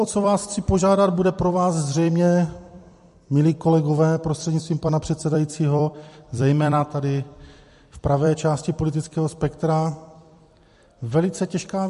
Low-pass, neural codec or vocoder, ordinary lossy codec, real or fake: 14.4 kHz; vocoder, 44.1 kHz, 128 mel bands every 256 samples, BigVGAN v2; MP3, 48 kbps; fake